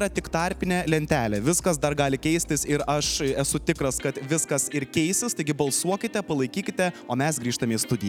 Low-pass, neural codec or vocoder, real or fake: 19.8 kHz; none; real